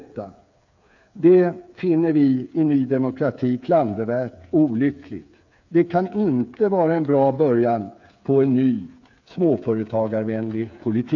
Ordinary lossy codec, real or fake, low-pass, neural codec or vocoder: AAC, 48 kbps; fake; 7.2 kHz; codec, 16 kHz, 8 kbps, FreqCodec, smaller model